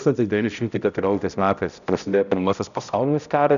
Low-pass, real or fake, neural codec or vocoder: 7.2 kHz; fake; codec, 16 kHz, 0.5 kbps, X-Codec, HuBERT features, trained on balanced general audio